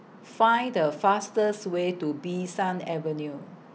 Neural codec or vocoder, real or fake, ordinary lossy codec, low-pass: none; real; none; none